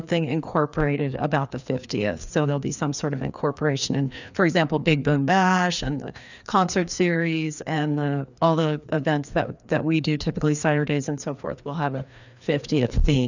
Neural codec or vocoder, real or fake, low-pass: codec, 16 kHz, 2 kbps, FreqCodec, larger model; fake; 7.2 kHz